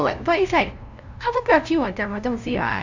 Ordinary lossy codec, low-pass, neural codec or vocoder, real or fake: none; 7.2 kHz; codec, 16 kHz, 0.5 kbps, FunCodec, trained on LibriTTS, 25 frames a second; fake